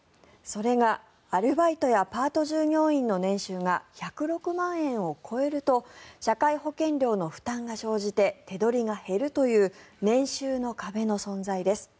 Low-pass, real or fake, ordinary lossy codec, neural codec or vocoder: none; real; none; none